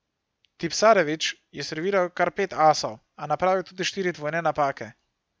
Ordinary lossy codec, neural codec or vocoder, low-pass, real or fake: none; none; none; real